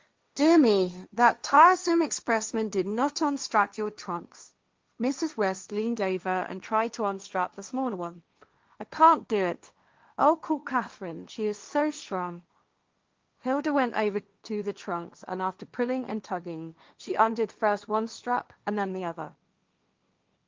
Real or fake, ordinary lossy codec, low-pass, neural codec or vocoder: fake; Opus, 32 kbps; 7.2 kHz; codec, 16 kHz, 1.1 kbps, Voila-Tokenizer